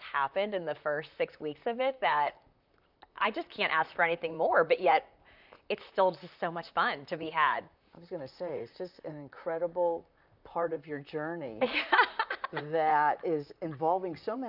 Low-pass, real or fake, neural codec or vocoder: 5.4 kHz; fake; vocoder, 44.1 kHz, 128 mel bands, Pupu-Vocoder